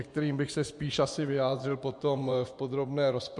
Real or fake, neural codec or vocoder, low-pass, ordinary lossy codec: fake; vocoder, 24 kHz, 100 mel bands, Vocos; 10.8 kHz; MP3, 64 kbps